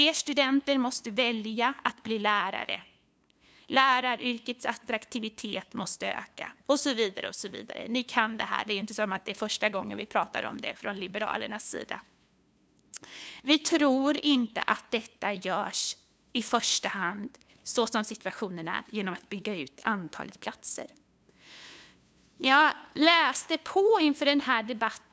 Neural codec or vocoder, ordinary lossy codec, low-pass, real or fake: codec, 16 kHz, 2 kbps, FunCodec, trained on LibriTTS, 25 frames a second; none; none; fake